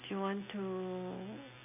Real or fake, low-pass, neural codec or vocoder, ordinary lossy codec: real; 3.6 kHz; none; none